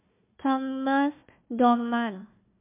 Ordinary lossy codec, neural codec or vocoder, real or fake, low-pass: MP3, 32 kbps; codec, 16 kHz, 1 kbps, FunCodec, trained on Chinese and English, 50 frames a second; fake; 3.6 kHz